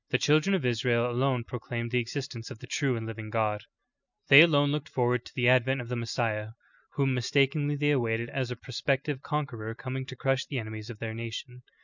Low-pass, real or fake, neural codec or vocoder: 7.2 kHz; real; none